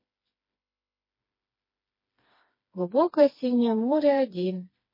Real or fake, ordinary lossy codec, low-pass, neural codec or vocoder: fake; MP3, 24 kbps; 5.4 kHz; codec, 16 kHz, 2 kbps, FreqCodec, smaller model